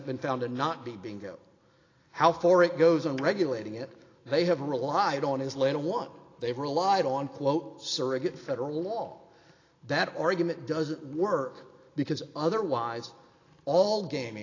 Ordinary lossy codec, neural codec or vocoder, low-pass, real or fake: AAC, 32 kbps; none; 7.2 kHz; real